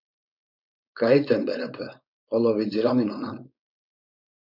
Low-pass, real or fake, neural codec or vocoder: 5.4 kHz; fake; codec, 16 kHz, 4.8 kbps, FACodec